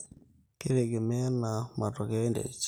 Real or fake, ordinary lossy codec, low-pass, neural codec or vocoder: real; none; none; none